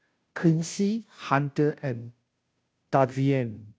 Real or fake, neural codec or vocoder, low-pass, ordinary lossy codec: fake; codec, 16 kHz, 0.5 kbps, FunCodec, trained on Chinese and English, 25 frames a second; none; none